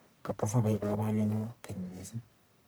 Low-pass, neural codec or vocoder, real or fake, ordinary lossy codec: none; codec, 44.1 kHz, 1.7 kbps, Pupu-Codec; fake; none